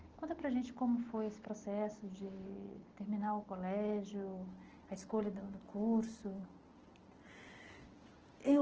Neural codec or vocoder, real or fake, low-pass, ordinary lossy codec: none; real; 7.2 kHz; Opus, 16 kbps